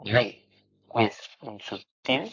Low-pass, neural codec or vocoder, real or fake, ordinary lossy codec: 7.2 kHz; codec, 44.1 kHz, 7.8 kbps, Pupu-Codec; fake; AAC, 48 kbps